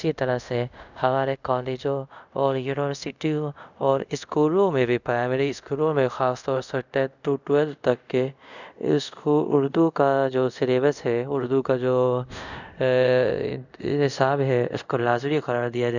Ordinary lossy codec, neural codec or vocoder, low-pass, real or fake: none; codec, 24 kHz, 0.5 kbps, DualCodec; 7.2 kHz; fake